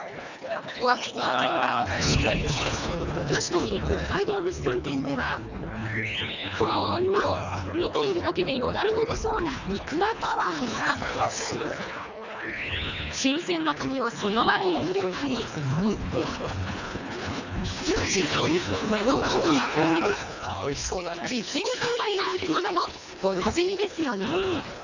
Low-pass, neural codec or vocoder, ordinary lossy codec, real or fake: 7.2 kHz; codec, 24 kHz, 1.5 kbps, HILCodec; none; fake